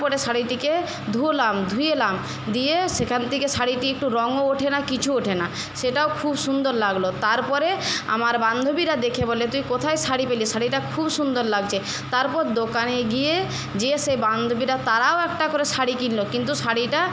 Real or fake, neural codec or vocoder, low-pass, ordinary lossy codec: real; none; none; none